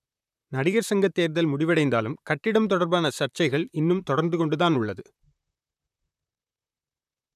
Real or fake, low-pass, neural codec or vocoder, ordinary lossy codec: fake; 14.4 kHz; vocoder, 44.1 kHz, 128 mel bands, Pupu-Vocoder; none